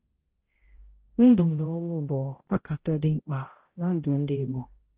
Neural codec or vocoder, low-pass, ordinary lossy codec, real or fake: codec, 16 kHz, 0.5 kbps, X-Codec, HuBERT features, trained on balanced general audio; 3.6 kHz; Opus, 16 kbps; fake